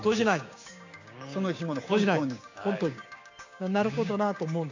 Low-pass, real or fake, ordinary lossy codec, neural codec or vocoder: 7.2 kHz; fake; AAC, 48 kbps; codec, 16 kHz, 6 kbps, DAC